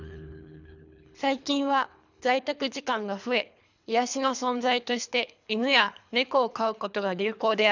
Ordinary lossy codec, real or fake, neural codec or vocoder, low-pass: none; fake; codec, 24 kHz, 3 kbps, HILCodec; 7.2 kHz